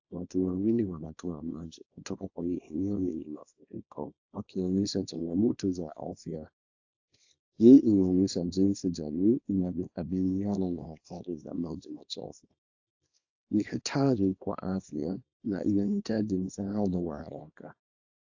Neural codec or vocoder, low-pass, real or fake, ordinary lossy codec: codec, 24 kHz, 0.9 kbps, WavTokenizer, small release; 7.2 kHz; fake; AAC, 48 kbps